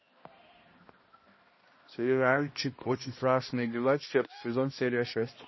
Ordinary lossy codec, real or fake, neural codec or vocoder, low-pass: MP3, 24 kbps; fake; codec, 16 kHz, 1 kbps, X-Codec, HuBERT features, trained on balanced general audio; 7.2 kHz